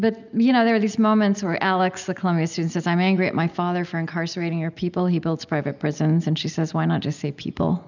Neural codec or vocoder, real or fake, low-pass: none; real; 7.2 kHz